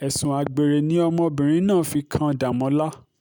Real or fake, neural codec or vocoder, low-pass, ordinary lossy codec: real; none; none; none